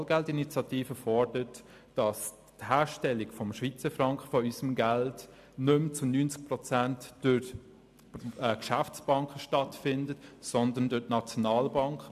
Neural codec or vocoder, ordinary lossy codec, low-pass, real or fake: none; AAC, 96 kbps; 14.4 kHz; real